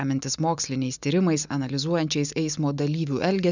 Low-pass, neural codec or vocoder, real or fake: 7.2 kHz; none; real